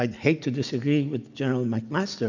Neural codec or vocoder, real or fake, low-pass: none; real; 7.2 kHz